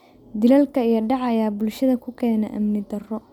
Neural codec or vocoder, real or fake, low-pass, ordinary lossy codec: none; real; 19.8 kHz; none